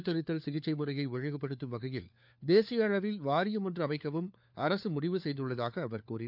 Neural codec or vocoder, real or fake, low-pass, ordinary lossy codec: codec, 16 kHz, 4 kbps, FunCodec, trained on LibriTTS, 50 frames a second; fake; 5.4 kHz; none